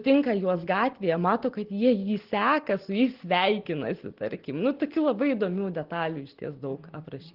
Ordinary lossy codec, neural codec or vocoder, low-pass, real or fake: Opus, 16 kbps; none; 5.4 kHz; real